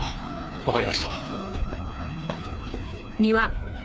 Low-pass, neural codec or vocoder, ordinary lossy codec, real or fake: none; codec, 16 kHz, 2 kbps, FreqCodec, larger model; none; fake